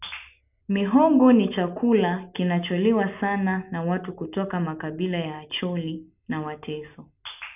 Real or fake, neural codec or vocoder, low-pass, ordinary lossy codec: real; none; 3.6 kHz; none